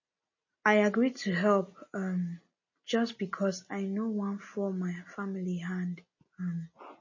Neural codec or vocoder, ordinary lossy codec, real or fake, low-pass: none; MP3, 32 kbps; real; 7.2 kHz